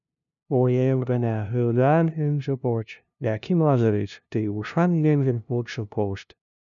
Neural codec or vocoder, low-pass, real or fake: codec, 16 kHz, 0.5 kbps, FunCodec, trained on LibriTTS, 25 frames a second; 7.2 kHz; fake